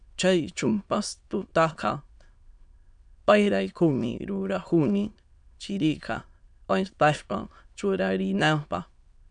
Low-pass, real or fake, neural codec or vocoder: 9.9 kHz; fake; autoencoder, 22.05 kHz, a latent of 192 numbers a frame, VITS, trained on many speakers